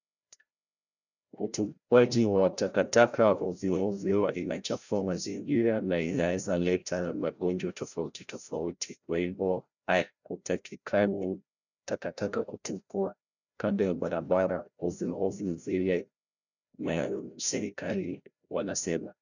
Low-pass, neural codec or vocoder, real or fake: 7.2 kHz; codec, 16 kHz, 0.5 kbps, FreqCodec, larger model; fake